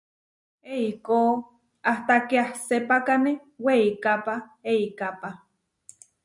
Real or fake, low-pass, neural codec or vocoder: real; 10.8 kHz; none